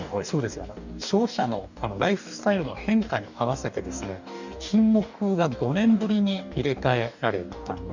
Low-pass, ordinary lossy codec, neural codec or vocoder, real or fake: 7.2 kHz; none; codec, 44.1 kHz, 2.6 kbps, DAC; fake